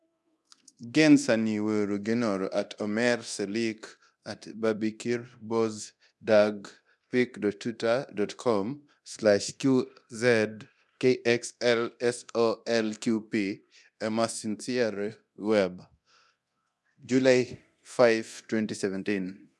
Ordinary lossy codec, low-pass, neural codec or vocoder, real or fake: none; none; codec, 24 kHz, 0.9 kbps, DualCodec; fake